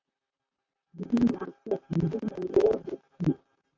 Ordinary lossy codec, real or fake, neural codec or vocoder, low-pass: AAC, 32 kbps; fake; vocoder, 44.1 kHz, 128 mel bands, Pupu-Vocoder; 7.2 kHz